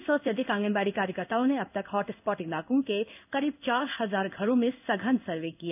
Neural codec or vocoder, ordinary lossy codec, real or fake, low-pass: codec, 16 kHz in and 24 kHz out, 1 kbps, XY-Tokenizer; none; fake; 3.6 kHz